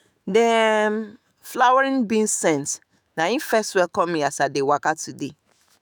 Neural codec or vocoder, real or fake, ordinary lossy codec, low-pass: autoencoder, 48 kHz, 128 numbers a frame, DAC-VAE, trained on Japanese speech; fake; none; none